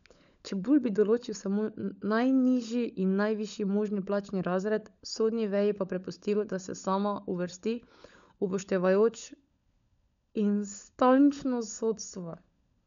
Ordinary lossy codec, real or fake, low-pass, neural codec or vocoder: none; fake; 7.2 kHz; codec, 16 kHz, 16 kbps, FunCodec, trained on LibriTTS, 50 frames a second